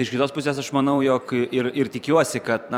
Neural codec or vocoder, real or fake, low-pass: vocoder, 44.1 kHz, 128 mel bands every 512 samples, BigVGAN v2; fake; 19.8 kHz